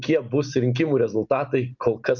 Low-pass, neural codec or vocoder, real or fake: 7.2 kHz; none; real